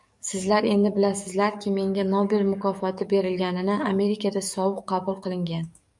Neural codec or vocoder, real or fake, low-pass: codec, 44.1 kHz, 7.8 kbps, DAC; fake; 10.8 kHz